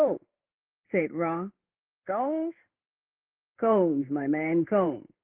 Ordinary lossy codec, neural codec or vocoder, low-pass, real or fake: Opus, 16 kbps; codec, 16 kHz in and 24 kHz out, 1 kbps, XY-Tokenizer; 3.6 kHz; fake